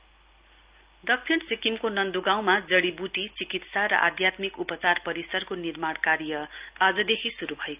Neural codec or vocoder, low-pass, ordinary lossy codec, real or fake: none; 3.6 kHz; Opus, 24 kbps; real